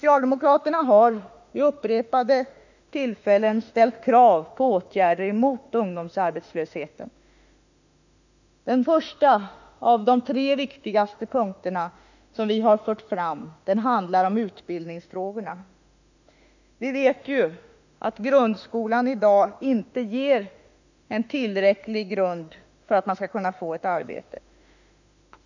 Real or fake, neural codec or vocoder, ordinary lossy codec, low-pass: fake; autoencoder, 48 kHz, 32 numbers a frame, DAC-VAE, trained on Japanese speech; none; 7.2 kHz